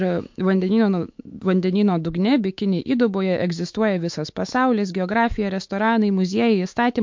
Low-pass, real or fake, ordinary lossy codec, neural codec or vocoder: 7.2 kHz; fake; MP3, 48 kbps; autoencoder, 48 kHz, 128 numbers a frame, DAC-VAE, trained on Japanese speech